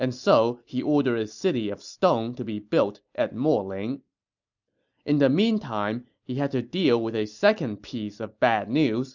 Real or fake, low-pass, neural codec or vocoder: real; 7.2 kHz; none